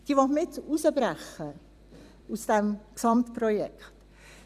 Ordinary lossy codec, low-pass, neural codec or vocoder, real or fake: none; 14.4 kHz; vocoder, 44.1 kHz, 128 mel bands every 256 samples, BigVGAN v2; fake